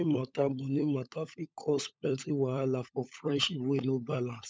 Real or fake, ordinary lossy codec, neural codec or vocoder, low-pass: fake; none; codec, 16 kHz, 16 kbps, FunCodec, trained on LibriTTS, 50 frames a second; none